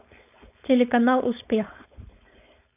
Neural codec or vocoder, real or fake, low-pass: codec, 16 kHz, 4.8 kbps, FACodec; fake; 3.6 kHz